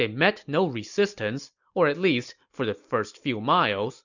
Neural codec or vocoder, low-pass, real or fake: none; 7.2 kHz; real